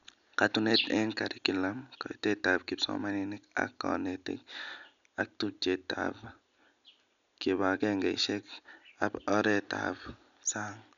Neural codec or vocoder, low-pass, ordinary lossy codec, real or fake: none; 7.2 kHz; none; real